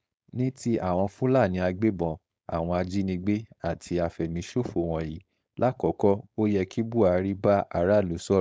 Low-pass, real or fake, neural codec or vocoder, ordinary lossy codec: none; fake; codec, 16 kHz, 4.8 kbps, FACodec; none